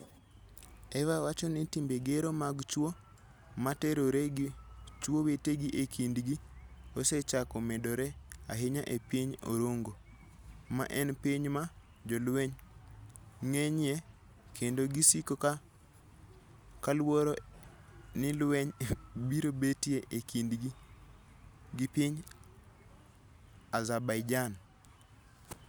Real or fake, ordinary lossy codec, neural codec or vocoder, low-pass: real; none; none; none